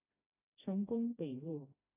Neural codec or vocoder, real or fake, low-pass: codec, 16 kHz, 1 kbps, FreqCodec, smaller model; fake; 3.6 kHz